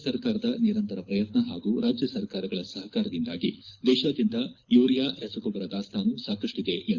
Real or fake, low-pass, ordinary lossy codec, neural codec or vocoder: fake; 7.2 kHz; Opus, 64 kbps; codec, 24 kHz, 6 kbps, HILCodec